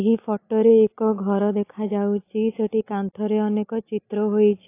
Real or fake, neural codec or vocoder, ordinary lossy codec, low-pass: real; none; AAC, 24 kbps; 3.6 kHz